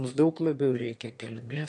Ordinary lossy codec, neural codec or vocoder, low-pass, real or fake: AAC, 48 kbps; autoencoder, 22.05 kHz, a latent of 192 numbers a frame, VITS, trained on one speaker; 9.9 kHz; fake